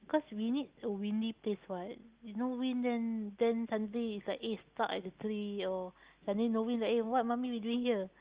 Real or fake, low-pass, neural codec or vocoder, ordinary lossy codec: real; 3.6 kHz; none; Opus, 32 kbps